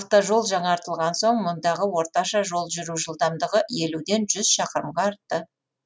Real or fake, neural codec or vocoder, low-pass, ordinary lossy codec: real; none; none; none